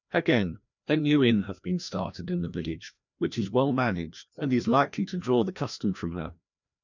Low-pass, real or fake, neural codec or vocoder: 7.2 kHz; fake; codec, 16 kHz, 1 kbps, FreqCodec, larger model